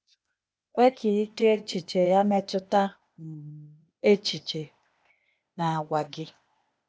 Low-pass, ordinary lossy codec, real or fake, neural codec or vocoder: none; none; fake; codec, 16 kHz, 0.8 kbps, ZipCodec